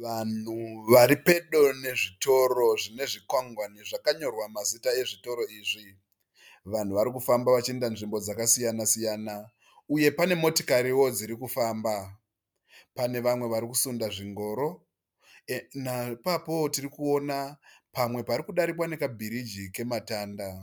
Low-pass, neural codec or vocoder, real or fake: 19.8 kHz; none; real